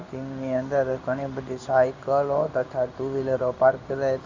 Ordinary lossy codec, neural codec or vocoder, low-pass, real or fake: AAC, 48 kbps; codec, 16 kHz in and 24 kHz out, 1 kbps, XY-Tokenizer; 7.2 kHz; fake